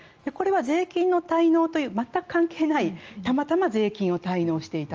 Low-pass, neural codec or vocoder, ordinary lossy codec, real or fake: 7.2 kHz; none; Opus, 24 kbps; real